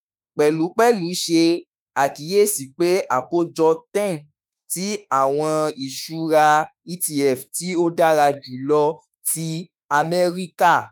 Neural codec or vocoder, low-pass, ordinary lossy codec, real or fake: autoencoder, 48 kHz, 32 numbers a frame, DAC-VAE, trained on Japanese speech; none; none; fake